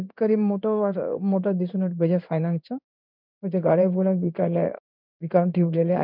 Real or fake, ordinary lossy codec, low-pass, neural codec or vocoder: fake; none; 5.4 kHz; codec, 16 kHz in and 24 kHz out, 1 kbps, XY-Tokenizer